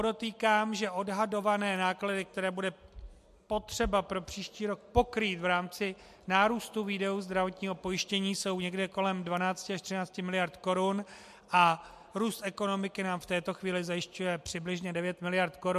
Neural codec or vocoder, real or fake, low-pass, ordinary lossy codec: none; real; 14.4 kHz; MP3, 64 kbps